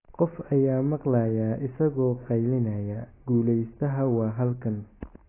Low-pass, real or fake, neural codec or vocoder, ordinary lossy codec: 3.6 kHz; real; none; AAC, 16 kbps